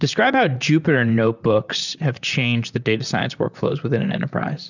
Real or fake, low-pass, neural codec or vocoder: fake; 7.2 kHz; vocoder, 44.1 kHz, 128 mel bands, Pupu-Vocoder